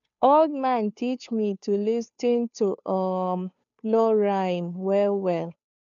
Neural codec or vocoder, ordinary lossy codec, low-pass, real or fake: codec, 16 kHz, 2 kbps, FunCodec, trained on Chinese and English, 25 frames a second; none; 7.2 kHz; fake